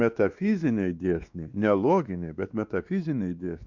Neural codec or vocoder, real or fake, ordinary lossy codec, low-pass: codec, 16 kHz, 2 kbps, X-Codec, WavLM features, trained on Multilingual LibriSpeech; fake; Opus, 64 kbps; 7.2 kHz